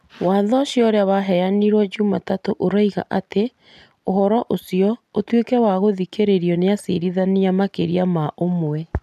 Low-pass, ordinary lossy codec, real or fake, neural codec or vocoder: 14.4 kHz; none; real; none